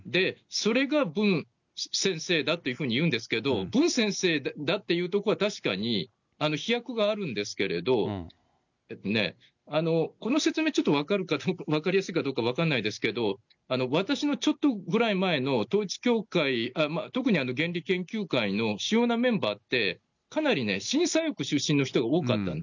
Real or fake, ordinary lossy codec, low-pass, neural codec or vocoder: real; none; 7.2 kHz; none